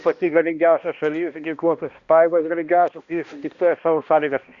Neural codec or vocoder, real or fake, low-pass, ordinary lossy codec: codec, 16 kHz, 1 kbps, X-Codec, HuBERT features, trained on balanced general audio; fake; 7.2 kHz; Opus, 64 kbps